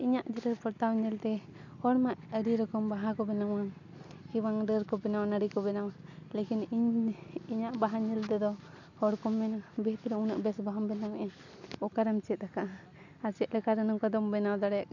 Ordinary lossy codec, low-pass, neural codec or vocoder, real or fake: none; 7.2 kHz; none; real